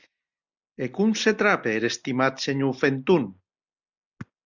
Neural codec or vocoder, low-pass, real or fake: none; 7.2 kHz; real